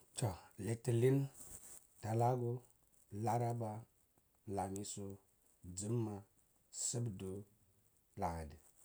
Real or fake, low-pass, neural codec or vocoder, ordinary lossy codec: real; none; none; none